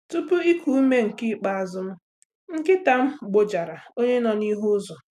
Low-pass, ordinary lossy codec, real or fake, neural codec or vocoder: 14.4 kHz; none; real; none